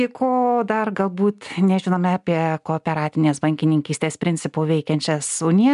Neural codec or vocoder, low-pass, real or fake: none; 10.8 kHz; real